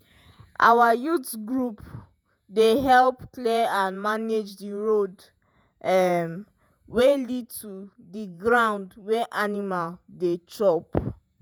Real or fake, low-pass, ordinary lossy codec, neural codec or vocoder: fake; none; none; vocoder, 48 kHz, 128 mel bands, Vocos